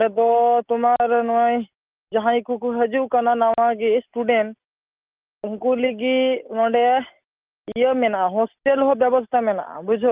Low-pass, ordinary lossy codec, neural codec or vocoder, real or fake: 3.6 kHz; Opus, 24 kbps; none; real